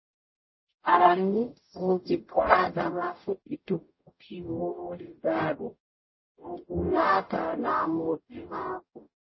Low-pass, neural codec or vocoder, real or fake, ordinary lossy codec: 7.2 kHz; codec, 44.1 kHz, 0.9 kbps, DAC; fake; MP3, 24 kbps